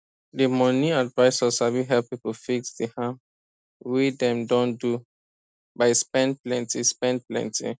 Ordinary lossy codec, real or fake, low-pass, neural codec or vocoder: none; real; none; none